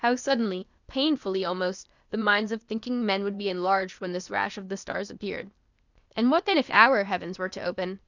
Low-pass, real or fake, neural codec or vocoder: 7.2 kHz; fake; codec, 16 kHz, 0.8 kbps, ZipCodec